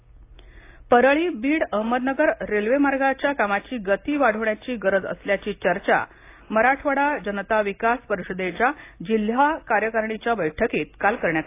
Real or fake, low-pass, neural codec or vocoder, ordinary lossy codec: real; 3.6 kHz; none; AAC, 24 kbps